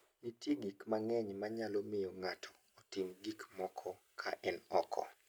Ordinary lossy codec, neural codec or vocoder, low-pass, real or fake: none; none; none; real